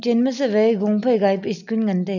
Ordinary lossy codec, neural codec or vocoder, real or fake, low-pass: none; none; real; 7.2 kHz